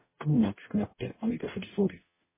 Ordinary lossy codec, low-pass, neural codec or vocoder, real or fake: MP3, 16 kbps; 3.6 kHz; codec, 44.1 kHz, 0.9 kbps, DAC; fake